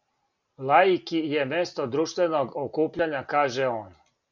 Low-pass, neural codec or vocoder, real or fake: 7.2 kHz; none; real